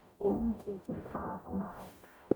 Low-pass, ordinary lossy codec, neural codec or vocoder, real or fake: none; none; codec, 44.1 kHz, 0.9 kbps, DAC; fake